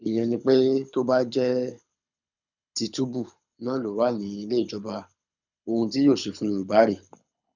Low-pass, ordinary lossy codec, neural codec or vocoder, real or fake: 7.2 kHz; none; codec, 24 kHz, 6 kbps, HILCodec; fake